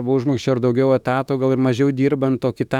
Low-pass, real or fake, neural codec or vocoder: 19.8 kHz; fake; autoencoder, 48 kHz, 32 numbers a frame, DAC-VAE, trained on Japanese speech